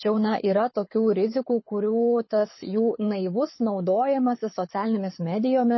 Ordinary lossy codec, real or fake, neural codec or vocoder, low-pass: MP3, 24 kbps; fake; codec, 24 kHz, 6 kbps, HILCodec; 7.2 kHz